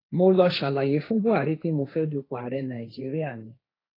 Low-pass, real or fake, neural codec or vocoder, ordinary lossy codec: 5.4 kHz; fake; codec, 16 kHz, 1.1 kbps, Voila-Tokenizer; AAC, 24 kbps